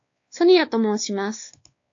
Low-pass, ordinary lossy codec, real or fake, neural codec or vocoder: 7.2 kHz; AAC, 48 kbps; fake; codec, 16 kHz, 2 kbps, X-Codec, WavLM features, trained on Multilingual LibriSpeech